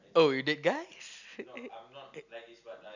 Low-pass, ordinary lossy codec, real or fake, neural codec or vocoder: 7.2 kHz; none; real; none